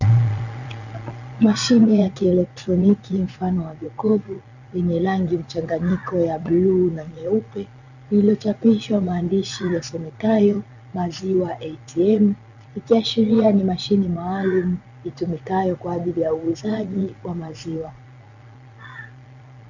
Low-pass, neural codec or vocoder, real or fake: 7.2 kHz; vocoder, 22.05 kHz, 80 mel bands, Vocos; fake